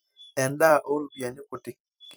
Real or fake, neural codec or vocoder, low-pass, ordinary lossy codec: fake; vocoder, 44.1 kHz, 128 mel bands every 256 samples, BigVGAN v2; none; none